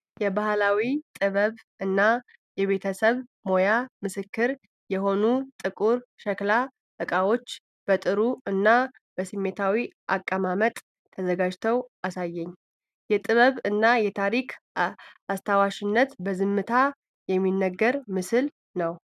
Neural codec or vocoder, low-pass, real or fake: none; 14.4 kHz; real